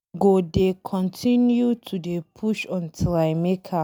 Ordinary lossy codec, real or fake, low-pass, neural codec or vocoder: none; real; none; none